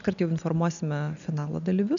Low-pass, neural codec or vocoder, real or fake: 7.2 kHz; none; real